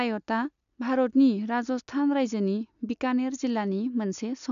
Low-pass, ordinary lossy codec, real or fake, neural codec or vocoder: 7.2 kHz; none; real; none